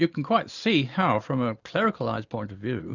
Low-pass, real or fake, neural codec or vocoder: 7.2 kHz; real; none